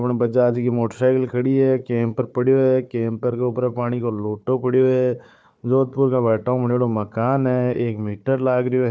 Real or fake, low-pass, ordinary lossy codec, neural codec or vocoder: fake; none; none; codec, 16 kHz, 4 kbps, FunCodec, trained on Chinese and English, 50 frames a second